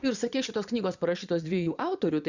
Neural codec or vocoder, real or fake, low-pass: vocoder, 22.05 kHz, 80 mel bands, Vocos; fake; 7.2 kHz